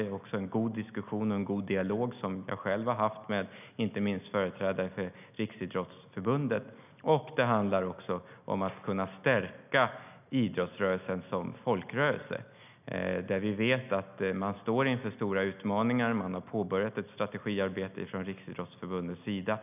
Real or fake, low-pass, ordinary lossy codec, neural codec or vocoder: real; 3.6 kHz; none; none